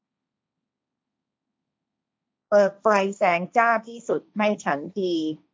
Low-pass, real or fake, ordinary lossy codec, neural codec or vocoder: none; fake; none; codec, 16 kHz, 1.1 kbps, Voila-Tokenizer